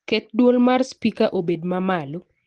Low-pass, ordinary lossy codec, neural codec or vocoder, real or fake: 10.8 kHz; Opus, 16 kbps; none; real